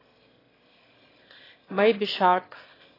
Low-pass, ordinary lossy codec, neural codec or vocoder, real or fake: 5.4 kHz; AAC, 24 kbps; autoencoder, 22.05 kHz, a latent of 192 numbers a frame, VITS, trained on one speaker; fake